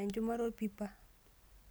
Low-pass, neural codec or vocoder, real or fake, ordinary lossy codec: none; none; real; none